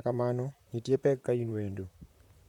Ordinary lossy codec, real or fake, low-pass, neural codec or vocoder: MP3, 96 kbps; fake; 19.8 kHz; vocoder, 44.1 kHz, 128 mel bands, Pupu-Vocoder